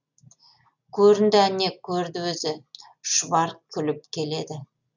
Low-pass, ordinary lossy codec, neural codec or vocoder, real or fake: 7.2 kHz; none; none; real